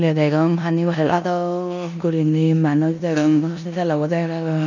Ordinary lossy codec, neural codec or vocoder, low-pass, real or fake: none; codec, 16 kHz in and 24 kHz out, 0.9 kbps, LongCat-Audio-Codec, four codebook decoder; 7.2 kHz; fake